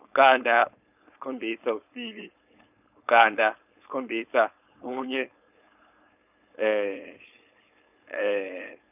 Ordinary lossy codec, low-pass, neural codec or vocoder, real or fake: none; 3.6 kHz; codec, 16 kHz, 4.8 kbps, FACodec; fake